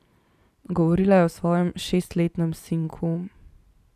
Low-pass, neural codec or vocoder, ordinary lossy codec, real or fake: 14.4 kHz; vocoder, 44.1 kHz, 128 mel bands every 512 samples, BigVGAN v2; none; fake